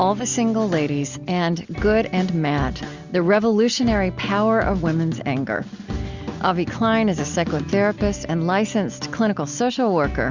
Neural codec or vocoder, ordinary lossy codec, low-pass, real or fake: none; Opus, 64 kbps; 7.2 kHz; real